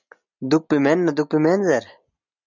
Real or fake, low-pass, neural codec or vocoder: real; 7.2 kHz; none